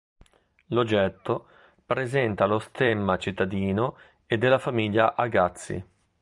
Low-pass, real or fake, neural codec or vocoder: 10.8 kHz; fake; vocoder, 44.1 kHz, 128 mel bands every 256 samples, BigVGAN v2